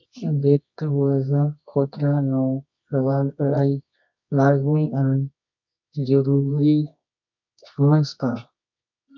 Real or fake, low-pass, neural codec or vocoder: fake; 7.2 kHz; codec, 24 kHz, 0.9 kbps, WavTokenizer, medium music audio release